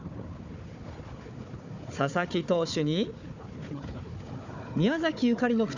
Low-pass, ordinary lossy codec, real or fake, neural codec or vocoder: 7.2 kHz; none; fake; codec, 16 kHz, 4 kbps, FunCodec, trained on Chinese and English, 50 frames a second